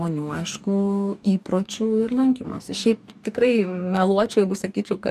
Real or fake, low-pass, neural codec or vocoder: fake; 14.4 kHz; codec, 44.1 kHz, 2.6 kbps, DAC